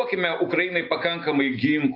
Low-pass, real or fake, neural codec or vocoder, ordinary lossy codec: 5.4 kHz; real; none; AAC, 32 kbps